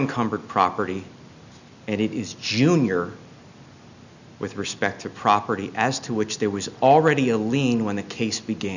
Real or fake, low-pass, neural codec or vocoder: real; 7.2 kHz; none